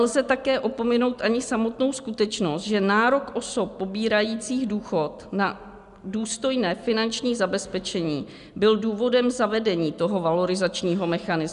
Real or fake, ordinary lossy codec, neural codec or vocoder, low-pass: real; MP3, 96 kbps; none; 10.8 kHz